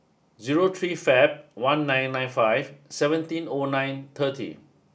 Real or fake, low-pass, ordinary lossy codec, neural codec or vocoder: real; none; none; none